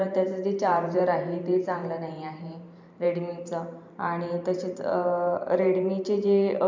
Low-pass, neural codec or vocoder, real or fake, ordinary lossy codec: 7.2 kHz; vocoder, 44.1 kHz, 128 mel bands every 512 samples, BigVGAN v2; fake; MP3, 64 kbps